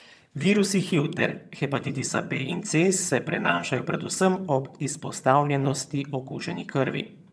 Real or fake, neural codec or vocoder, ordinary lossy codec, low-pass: fake; vocoder, 22.05 kHz, 80 mel bands, HiFi-GAN; none; none